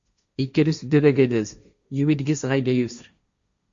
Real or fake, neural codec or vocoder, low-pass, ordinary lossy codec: fake; codec, 16 kHz, 1.1 kbps, Voila-Tokenizer; 7.2 kHz; Opus, 64 kbps